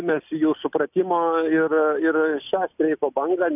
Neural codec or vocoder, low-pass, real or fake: none; 3.6 kHz; real